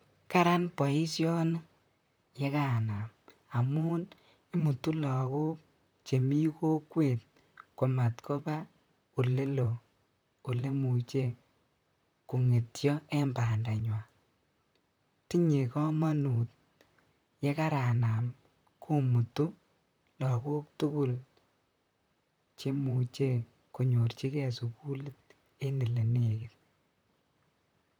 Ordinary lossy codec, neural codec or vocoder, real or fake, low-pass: none; vocoder, 44.1 kHz, 128 mel bands, Pupu-Vocoder; fake; none